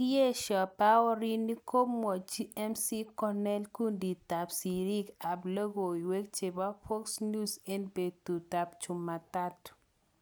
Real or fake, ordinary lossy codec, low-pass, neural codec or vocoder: real; none; none; none